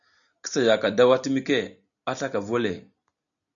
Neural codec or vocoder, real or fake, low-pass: none; real; 7.2 kHz